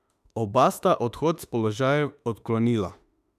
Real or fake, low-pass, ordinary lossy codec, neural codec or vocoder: fake; 14.4 kHz; none; autoencoder, 48 kHz, 32 numbers a frame, DAC-VAE, trained on Japanese speech